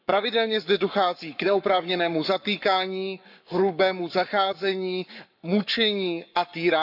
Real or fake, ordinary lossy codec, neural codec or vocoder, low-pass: fake; none; codec, 44.1 kHz, 7.8 kbps, Pupu-Codec; 5.4 kHz